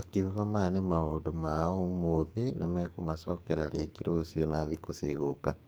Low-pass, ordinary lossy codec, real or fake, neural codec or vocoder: none; none; fake; codec, 44.1 kHz, 2.6 kbps, SNAC